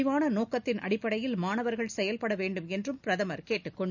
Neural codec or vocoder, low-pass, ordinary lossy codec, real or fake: none; 7.2 kHz; none; real